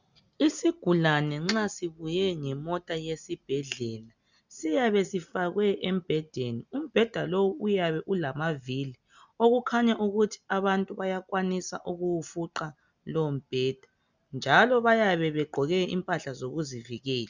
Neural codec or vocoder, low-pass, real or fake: none; 7.2 kHz; real